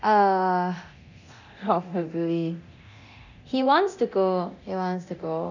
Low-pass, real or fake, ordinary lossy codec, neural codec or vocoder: 7.2 kHz; fake; none; codec, 24 kHz, 0.9 kbps, DualCodec